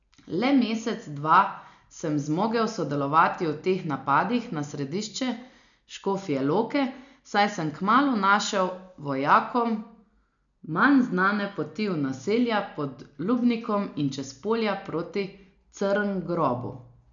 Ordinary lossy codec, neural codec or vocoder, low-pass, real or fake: none; none; 7.2 kHz; real